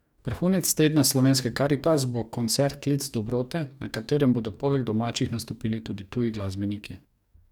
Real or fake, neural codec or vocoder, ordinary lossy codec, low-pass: fake; codec, 44.1 kHz, 2.6 kbps, DAC; none; 19.8 kHz